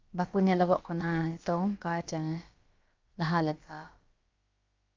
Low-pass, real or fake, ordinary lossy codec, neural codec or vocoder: 7.2 kHz; fake; Opus, 24 kbps; codec, 16 kHz, about 1 kbps, DyCAST, with the encoder's durations